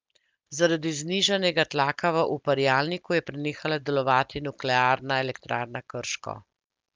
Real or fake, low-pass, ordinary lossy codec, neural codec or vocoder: real; 7.2 kHz; Opus, 24 kbps; none